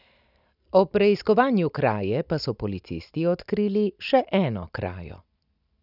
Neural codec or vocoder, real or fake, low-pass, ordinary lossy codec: none; real; 5.4 kHz; none